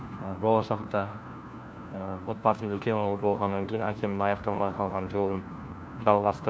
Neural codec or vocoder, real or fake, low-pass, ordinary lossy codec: codec, 16 kHz, 1 kbps, FunCodec, trained on LibriTTS, 50 frames a second; fake; none; none